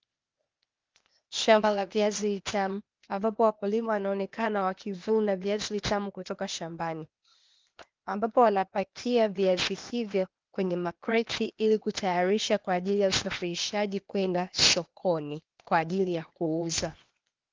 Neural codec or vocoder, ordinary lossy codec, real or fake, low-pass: codec, 16 kHz, 0.8 kbps, ZipCodec; Opus, 24 kbps; fake; 7.2 kHz